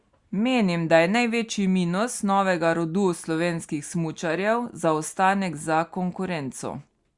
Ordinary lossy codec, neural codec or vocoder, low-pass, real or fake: Opus, 64 kbps; none; 10.8 kHz; real